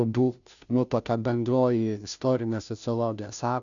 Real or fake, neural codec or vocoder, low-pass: fake; codec, 16 kHz, 0.5 kbps, FunCodec, trained on Chinese and English, 25 frames a second; 7.2 kHz